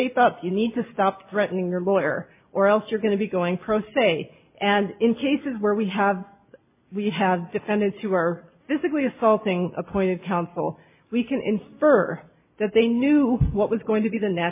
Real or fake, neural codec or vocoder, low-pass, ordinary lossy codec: real; none; 3.6 kHz; MP3, 24 kbps